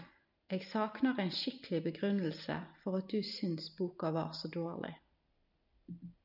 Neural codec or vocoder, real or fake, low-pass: none; real; 5.4 kHz